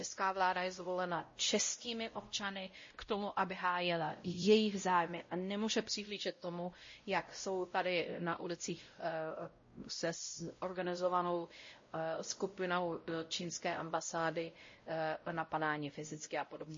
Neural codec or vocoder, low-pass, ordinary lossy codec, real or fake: codec, 16 kHz, 0.5 kbps, X-Codec, WavLM features, trained on Multilingual LibriSpeech; 7.2 kHz; MP3, 32 kbps; fake